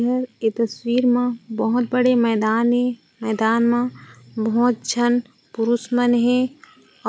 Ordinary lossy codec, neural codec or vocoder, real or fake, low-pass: none; none; real; none